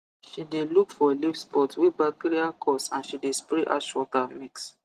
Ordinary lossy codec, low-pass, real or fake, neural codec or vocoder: Opus, 24 kbps; 14.4 kHz; real; none